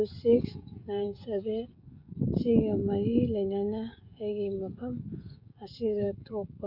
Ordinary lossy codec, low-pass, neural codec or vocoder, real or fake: none; 5.4 kHz; codec, 16 kHz, 16 kbps, FreqCodec, smaller model; fake